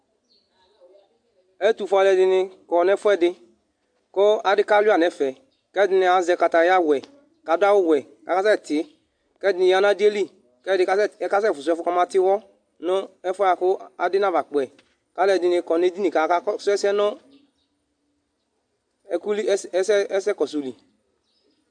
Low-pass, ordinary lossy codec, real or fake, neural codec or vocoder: 9.9 kHz; AAC, 64 kbps; real; none